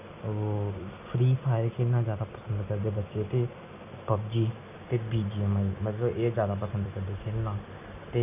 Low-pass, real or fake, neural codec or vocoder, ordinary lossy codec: 3.6 kHz; real; none; none